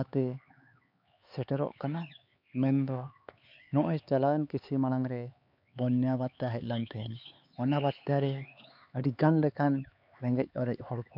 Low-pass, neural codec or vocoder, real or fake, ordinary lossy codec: 5.4 kHz; codec, 16 kHz, 4 kbps, X-Codec, HuBERT features, trained on LibriSpeech; fake; MP3, 48 kbps